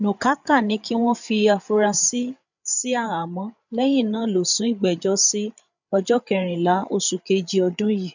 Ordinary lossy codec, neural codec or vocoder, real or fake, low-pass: none; codec, 16 kHz, 8 kbps, FreqCodec, larger model; fake; 7.2 kHz